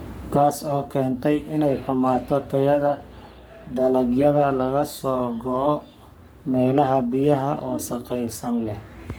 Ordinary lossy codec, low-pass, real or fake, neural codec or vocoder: none; none; fake; codec, 44.1 kHz, 3.4 kbps, Pupu-Codec